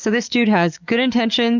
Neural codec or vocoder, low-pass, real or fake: codec, 44.1 kHz, 7.8 kbps, DAC; 7.2 kHz; fake